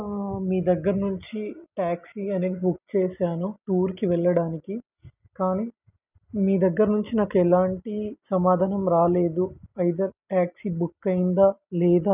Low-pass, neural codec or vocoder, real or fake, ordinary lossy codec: 3.6 kHz; none; real; none